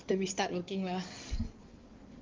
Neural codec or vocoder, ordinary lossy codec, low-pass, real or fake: codec, 16 kHz in and 24 kHz out, 2.2 kbps, FireRedTTS-2 codec; Opus, 32 kbps; 7.2 kHz; fake